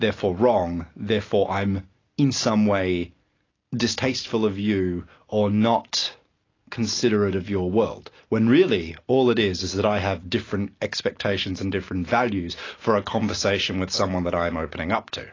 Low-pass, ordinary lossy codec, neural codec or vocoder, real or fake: 7.2 kHz; AAC, 32 kbps; none; real